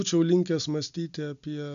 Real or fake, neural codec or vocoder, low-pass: real; none; 7.2 kHz